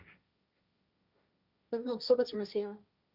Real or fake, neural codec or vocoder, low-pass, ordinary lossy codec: fake; codec, 16 kHz, 1.1 kbps, Voila-Tokenizer; 5.4 kHz; Opus, 64 kbps